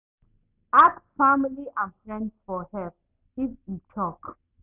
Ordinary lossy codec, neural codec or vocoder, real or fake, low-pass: none; none; real; 3.6 kHz